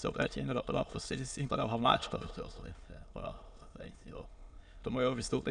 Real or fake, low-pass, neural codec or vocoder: fake; 9.9 kHz; autoencoder, 22.05 kHz, a latent of 192 numbers a frame, VITS, trained on many speakers